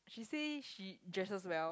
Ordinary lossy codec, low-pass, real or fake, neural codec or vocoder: none; none; real; none